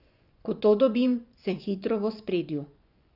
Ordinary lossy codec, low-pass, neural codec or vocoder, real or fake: none; 5.4 kHz; vocoder, 24 kHz, 100 mel bands, Vocos; fake